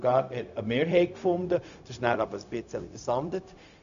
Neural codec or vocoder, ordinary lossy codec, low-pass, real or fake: codec, 16 kHz, 0.4 kbps, LongCat-Audio-Codec; none; 7.2 kHz; fake